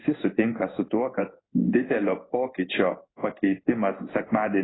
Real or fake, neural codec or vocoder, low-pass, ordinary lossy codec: fake; codec, 16 kHz in and 24 kHz out, 1 kbps, XY-Tokenizer; 7.2 kHz; AAC, 16 kbps